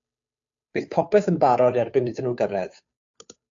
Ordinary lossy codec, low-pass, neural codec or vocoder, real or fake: AAC, 64 kbps; 7.2 kHz; codec, 16 kHz, 2 kbps, FunCodec, trained on Chinese and English, 25 frames a second; fake